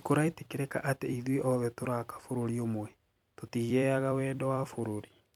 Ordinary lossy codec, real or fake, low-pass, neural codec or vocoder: MP3, 96 kbps; fake; 19.8 kHz; vocoder, 44.1 kHz, 128 mel bands every 256 samples, BigVGAN v2